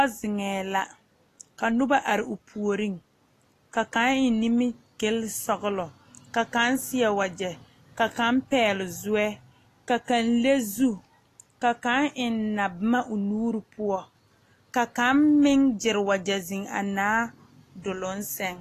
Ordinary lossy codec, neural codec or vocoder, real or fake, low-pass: AAC, 48 kbps; none; real; 14.4 kHz